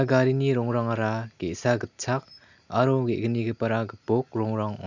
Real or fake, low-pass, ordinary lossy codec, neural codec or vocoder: real; 7.2 kHz; none; none